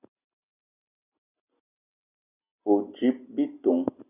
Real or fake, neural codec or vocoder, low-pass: real; none; 3.6 kHz